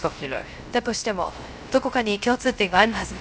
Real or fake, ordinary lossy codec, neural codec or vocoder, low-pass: fake; none; codec, 16 kHz, 0.3 kbps, FocalCodec; none